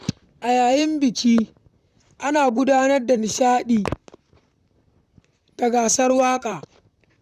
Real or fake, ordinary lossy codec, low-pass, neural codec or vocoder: fake; none; 14.4 kHz; vocoder, 44.1 kHz, 128 mel bands, Pupu-Vocoder